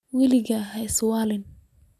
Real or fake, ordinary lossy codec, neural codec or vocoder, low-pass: real; none; none; 14.4 kHz